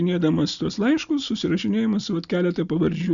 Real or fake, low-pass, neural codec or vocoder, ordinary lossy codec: real; 7.2 kHz; none; Opus, 64 kbps